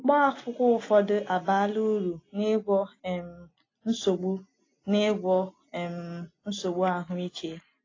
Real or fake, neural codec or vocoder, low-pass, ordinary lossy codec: real; none; 7.2 kHz; AAC, 32 kbps